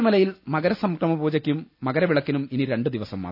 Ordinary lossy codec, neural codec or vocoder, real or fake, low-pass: none; none; real; 5.4 kHz